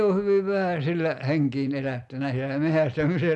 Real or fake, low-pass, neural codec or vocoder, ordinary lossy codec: real; none; none; none